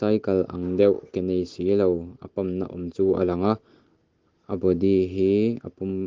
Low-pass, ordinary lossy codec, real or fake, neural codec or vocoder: 7.2 kHz; Opus, 16 kbps; real; none